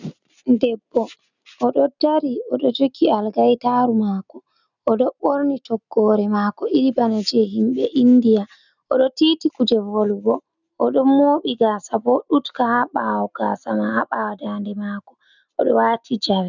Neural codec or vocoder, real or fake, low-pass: none; real; 7.2 kHz